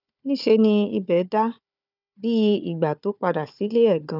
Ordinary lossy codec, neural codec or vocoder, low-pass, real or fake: none; codec, 16 kHz, 4 kbps, FunCodec, trained on Chinese and English, 50 frames a second; 5.4 kHz; fake